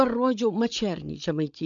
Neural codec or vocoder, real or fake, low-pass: codec, 16 kHz, 8 kbps, FreqCodec, larger model; fake; 7.2 kHz